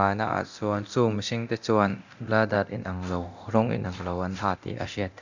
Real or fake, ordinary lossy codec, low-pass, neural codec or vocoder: fake; none; 7.2 kHz; codec, 24 kHz, 0.9 kbps, DualCodec